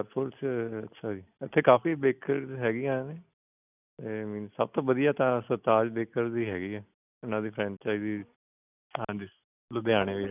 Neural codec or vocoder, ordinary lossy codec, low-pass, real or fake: none; none; 3.6 kHz; real